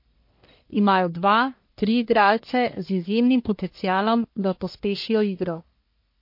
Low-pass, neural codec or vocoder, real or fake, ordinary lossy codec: 5.4 kHz; codec, 44.1 kHz, 1.7 kbps, Pupu-Codec; fake; MP3, 32 kbps